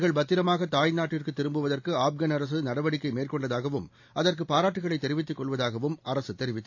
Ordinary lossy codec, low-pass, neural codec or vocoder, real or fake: AAC, 48 kbps; 7.2 kHz; none; real